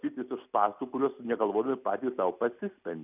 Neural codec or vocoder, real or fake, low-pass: none; real; 3.6 kHz